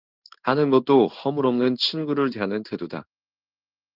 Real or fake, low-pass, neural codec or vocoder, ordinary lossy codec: fake; 5.4 kHz; codec, 16 kHz in and 24 kHz out, 1 kbps, XY-Tokenizer; Opus, 32 kbps